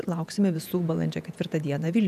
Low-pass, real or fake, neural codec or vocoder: 14.4 kHz; fake; vocoder, 48 kHz, 128 mel bands, Vocos